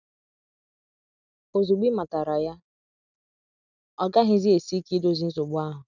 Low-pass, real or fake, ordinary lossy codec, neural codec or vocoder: 7.2 kHz; real; none; none